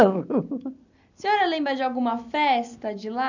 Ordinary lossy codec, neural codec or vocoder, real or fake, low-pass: none; none; real; 7.2 kHz